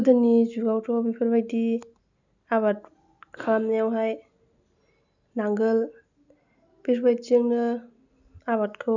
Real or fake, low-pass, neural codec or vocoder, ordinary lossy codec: real; 7.2 kHz; none; none